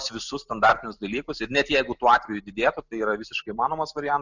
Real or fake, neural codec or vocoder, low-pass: real; none; 7.2 kHz